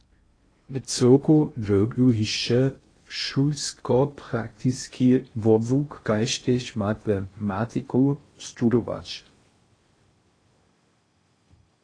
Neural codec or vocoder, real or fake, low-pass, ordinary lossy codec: codec, 16 kHz in and 24 kHz out, 0.6 kbps, FocalCodec, streaming, 2048 codes; fake; 9.9 kHz; AAC, 32 kbps